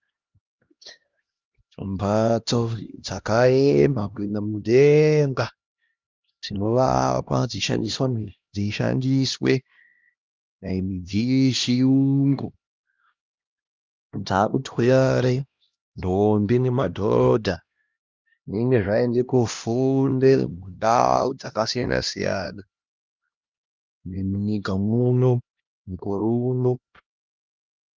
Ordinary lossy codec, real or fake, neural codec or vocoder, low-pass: Opus, 32 kbps; fake; codec, 16 kHz, 1 kbps, X-Codec, HuBERT features, trained on LibriSpeech; 7.2 kHz